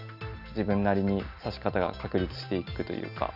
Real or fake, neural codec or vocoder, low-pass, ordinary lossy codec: real; none; 5.4 kHz; none